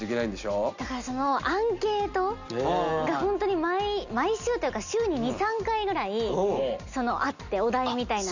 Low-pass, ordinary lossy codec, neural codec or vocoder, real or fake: 7.2 kHz; none; none; real